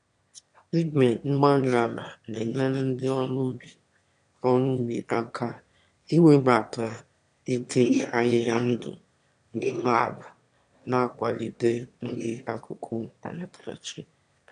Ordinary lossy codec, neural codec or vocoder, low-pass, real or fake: MP3, 64 kbps; autoencoder, 22.05 kHz, a latent of 192 numbers a frame, VITS, trained on one speaker; 9.9 kHz; fake